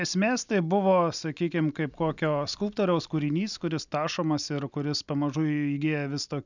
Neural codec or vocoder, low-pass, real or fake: none; 7.2 kHz; real